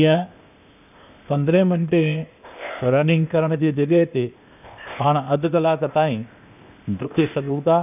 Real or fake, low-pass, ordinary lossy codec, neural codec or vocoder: fake; 3.6 kHz; none; codec, 16 kHz, 0.8 kbps, ZipCodec